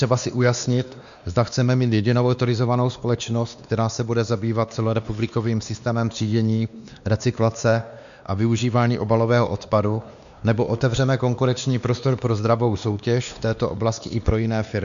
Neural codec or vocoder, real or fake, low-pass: codec, 16 kHz, 2 kbps, X-Codec, WavLM features, trained on Multilingual LibriSpeech; fake; 7.2 kHz